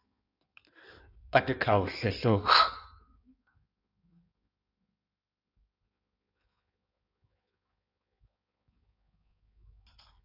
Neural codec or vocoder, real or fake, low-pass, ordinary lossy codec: codec, 16 kHz in and 24 kHz out, 1.1 kbps, FireRedTTS-2 codec; fake; 5.4 kHz; AAC, 48 kbps